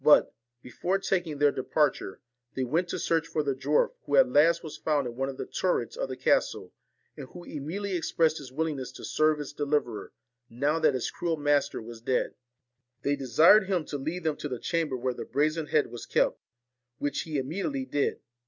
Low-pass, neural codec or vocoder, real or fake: 7.2 kHz; none; real